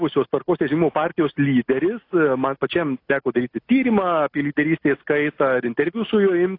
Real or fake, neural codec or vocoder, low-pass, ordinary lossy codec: real; none; 5.4 kHz; AAC, 32 kbps